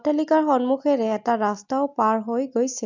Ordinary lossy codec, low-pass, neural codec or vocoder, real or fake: MP3, 64 kbps; 7.2 kHz; none; real